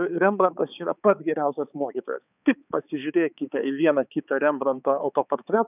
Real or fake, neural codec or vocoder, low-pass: fake; codec, 16 kHz, 4 kbps, X-Codec, HuBERT features, trained on balanced general audio; 3.6 kHz